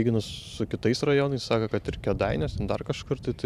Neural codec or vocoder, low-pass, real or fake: vocoder, 44.1 kHz, 128 mel bands every 512 samples, BigVGAN v2; 14.4 kHz; fake